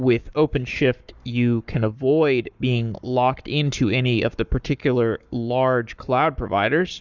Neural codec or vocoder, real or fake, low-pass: codec, 44.1 kHz, 7.8 kbps, Pupu-Codec; fake; 7.2 kHz